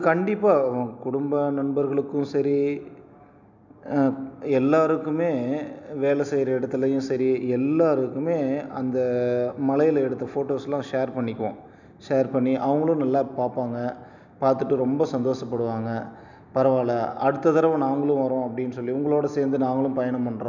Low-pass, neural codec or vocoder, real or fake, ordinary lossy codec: 7.2 kHz; none; real; none